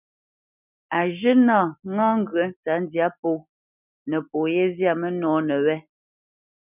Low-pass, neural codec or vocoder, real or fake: 3.6 kHz; none; real